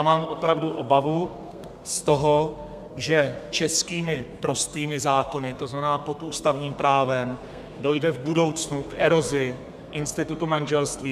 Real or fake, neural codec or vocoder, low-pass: fake; codec, 32 kHz, 1.9 kbps, SNAC; 14.4 kHz